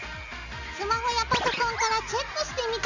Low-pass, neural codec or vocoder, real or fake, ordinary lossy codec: 7.2 kHz; none; real; none